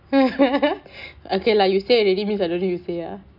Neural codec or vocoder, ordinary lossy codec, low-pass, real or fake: none; none; 5.4 kHz; real